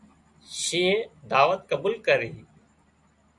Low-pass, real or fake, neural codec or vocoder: 10.8 kHz; real; none